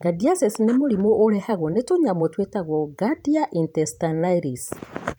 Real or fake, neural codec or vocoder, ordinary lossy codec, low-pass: fake; vocoder, 44.1 kHz, 128 mel bands every 512 samples, BigVGAN v2; none; none